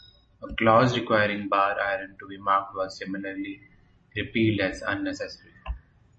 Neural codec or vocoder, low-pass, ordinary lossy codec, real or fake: none; 10.8 kHz; MP3, 32 kbps; real